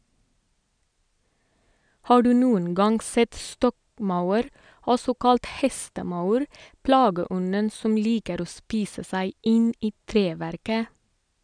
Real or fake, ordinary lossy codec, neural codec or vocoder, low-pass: real; none; none; 9.9 kHz